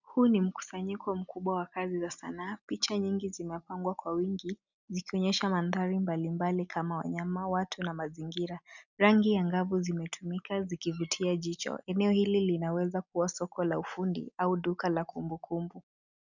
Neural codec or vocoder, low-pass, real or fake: none; 7.2 kHz; real